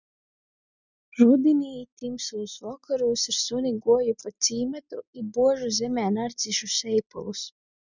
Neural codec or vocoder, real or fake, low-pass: none; real; 7.2 kHz